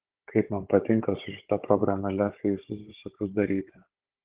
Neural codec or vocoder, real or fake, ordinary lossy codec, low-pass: codec, 16 kHz, 16 kbps, FunCodec, trained on Chinese and English, 50 frames a second; fake; Opus, 16 kbps; 3.6 kHz